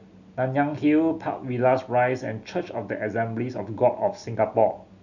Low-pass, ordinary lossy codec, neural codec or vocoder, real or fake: 7.2 kHz; none; none; real